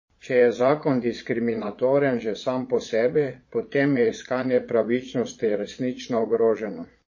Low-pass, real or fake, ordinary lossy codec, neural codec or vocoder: 7.2 kHz; fake; MP3, 32 kbps; vocoder, 22.05 kHz, 80 mel bands, Vocos